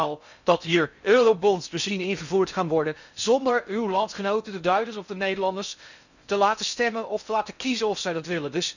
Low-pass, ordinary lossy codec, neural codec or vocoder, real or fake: 7.2 kHz; none; codec, 16 kHz in and 24 kHz out, 0.6 kbps, FocalCodec, streaming, 4096 codes; fake